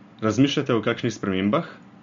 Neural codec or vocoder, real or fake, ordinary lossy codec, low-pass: none; real; MP3, 48 kbps; 7.2 kHz